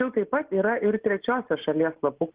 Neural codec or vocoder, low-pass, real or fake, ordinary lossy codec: none; 3.6 kHz; real; Opus, 16 kbps